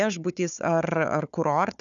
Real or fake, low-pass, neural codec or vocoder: fake; 7.2 kHz; codec, 16 kHz, 4 kbps, FunCodec, trained on Chinese and English, 50 frames a second